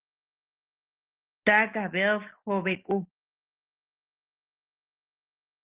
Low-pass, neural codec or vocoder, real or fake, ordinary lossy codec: 3.6 kHz; none; real; Opus, 16 kbps